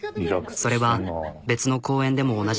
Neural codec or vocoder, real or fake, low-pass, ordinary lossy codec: none; real; none; none